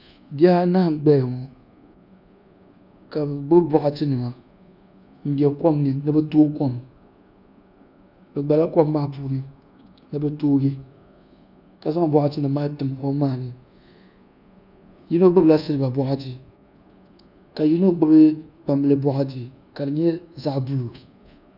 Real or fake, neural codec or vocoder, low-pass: fake; codec, 24 kHz, 1.2 kbps, DualCodec; 5.4 kHz